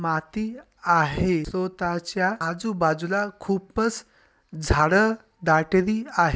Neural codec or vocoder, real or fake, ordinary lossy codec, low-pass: none; real; none; none